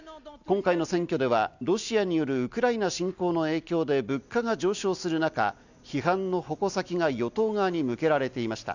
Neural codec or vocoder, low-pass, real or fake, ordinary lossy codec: none; 7.2 kHz; real; none